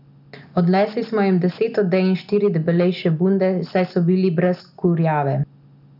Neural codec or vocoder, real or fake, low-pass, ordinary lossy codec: none; real; 5.4 kHz; none